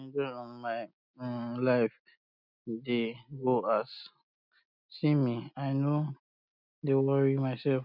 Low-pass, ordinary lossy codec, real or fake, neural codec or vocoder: 5.4 kHz; none; real; none